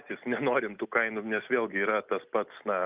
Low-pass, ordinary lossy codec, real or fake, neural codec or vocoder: 3.6 kHz; Opus, 24 kbps; real; none